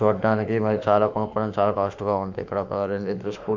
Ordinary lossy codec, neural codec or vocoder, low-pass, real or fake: Opus, 64 kbps; autoencoder, 48 kHz, 32 numbers a frame, DAC-VAE, trained on Japanese speech; 7.2 kHz; fake